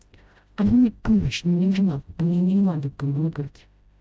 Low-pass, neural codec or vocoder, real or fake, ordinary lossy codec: none; codec, 16 kHz, 0.5 kbps, FreqCodec, smaller model; fake; none